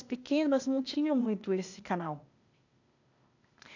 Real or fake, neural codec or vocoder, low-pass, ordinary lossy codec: fake; codec, 16 kHz, 0.8 kbps, ZipCodec; 7.2 kHz; none